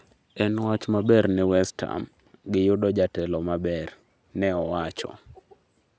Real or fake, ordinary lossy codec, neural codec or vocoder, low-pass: real; none; none; none